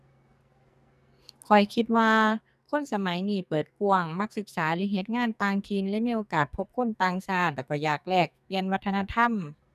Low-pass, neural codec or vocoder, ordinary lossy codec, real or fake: 14.4 kHz; codec, 44.1 kHz, 2.6 kbps, SNAC; none; fake